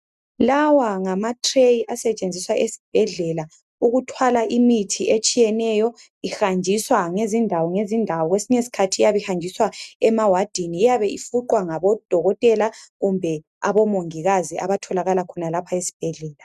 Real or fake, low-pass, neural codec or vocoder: real; 14.4 kHz; none